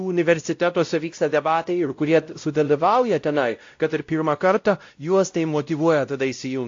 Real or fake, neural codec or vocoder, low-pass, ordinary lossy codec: fake; codec, 16 kHz, 0.5 kbps, X-Codec, WavLM features, trained on Multilingual LibriSpeech; 7.2 kHz; AAC, 48 kbps